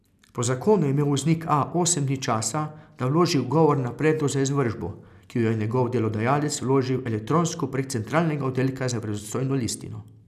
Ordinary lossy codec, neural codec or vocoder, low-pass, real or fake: none; none; 14.4 kHz; real